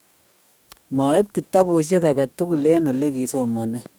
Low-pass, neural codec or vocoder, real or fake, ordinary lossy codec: none; codec, 44.1 kHz, 2.6 kbps, DAC; fake; none